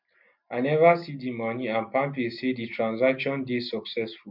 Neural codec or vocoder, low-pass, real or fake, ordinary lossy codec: none; 5.4 kHz; real; MP3, 48 kbps